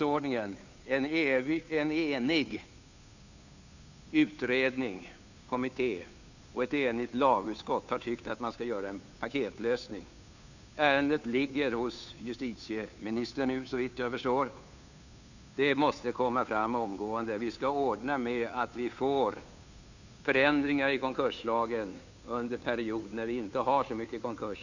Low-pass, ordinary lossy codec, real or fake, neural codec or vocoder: 7.2 kHz; none; fake; codec, 16 kHz, 2 kbps, FunCodec, trained on Chinese and English, 25 frames a second